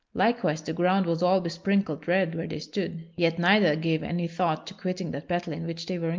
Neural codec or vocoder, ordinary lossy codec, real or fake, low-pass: none; Opus, 32 kbps; real; 7.2 kHz